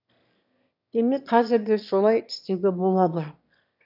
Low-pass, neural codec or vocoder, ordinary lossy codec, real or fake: 5.4 kHz; autoencoder, 22.05 kHz, a latent of 192 numbers a frame, VITS, trained on one speaker; none; fake